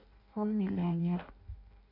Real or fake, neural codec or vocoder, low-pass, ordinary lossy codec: fake; codec, 16 kHz in and 24 kHz out, 0.6 kbps, FireRedTTS-2 codec; 5.4 kHz; MP3, 48 kbps